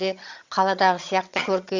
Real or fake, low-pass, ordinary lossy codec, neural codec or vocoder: fake; 7.2 kHz; Opus, 64 kbps; vocoder, 22.05 kHz, 80 mel bands, HiFi-GAN